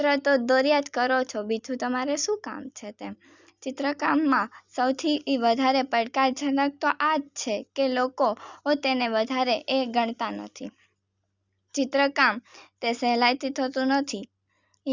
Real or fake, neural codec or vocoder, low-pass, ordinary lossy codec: real; none; 7.2 kHz; Opus, 64 kbps